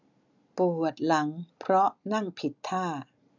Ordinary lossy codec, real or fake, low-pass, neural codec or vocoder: none; real; 7.2 kHz; none